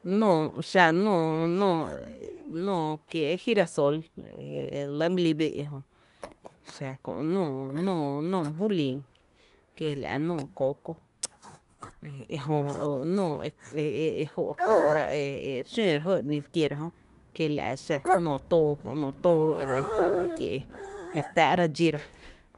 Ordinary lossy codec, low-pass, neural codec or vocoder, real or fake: none; 10.8 kHz; codec, 24 kHz, 1 kbps, SNAC; fake